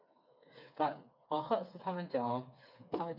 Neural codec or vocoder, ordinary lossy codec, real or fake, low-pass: codec, 16 kHz, 4 kbps, FreqCodec, smaller model; none; fake; 5.4 kHz